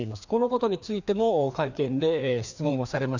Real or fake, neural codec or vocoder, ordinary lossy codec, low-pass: fake; codec, 16 kHz, 2 kbps, FreqCodec, larger model; none; 7.2 kHz